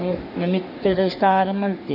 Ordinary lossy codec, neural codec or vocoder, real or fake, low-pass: none; codec, 44.1 kHz, 3.4 kbps, Pupu-Codec; fake; 5.4 kHz